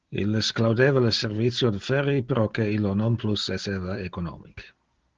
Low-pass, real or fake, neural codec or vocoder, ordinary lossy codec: 7.2 kHz; real; none; Opus, 16 kbps